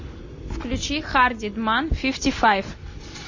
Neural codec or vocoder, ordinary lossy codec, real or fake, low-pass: none; MP3, 32 kbps; real; 7.2 kHz